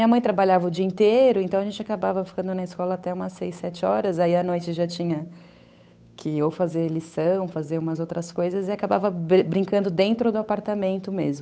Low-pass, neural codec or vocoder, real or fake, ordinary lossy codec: none; codec, 16 kHz, 8 kbps, FunCodec, trained on Chinese and English, 25 frames a second; fake; none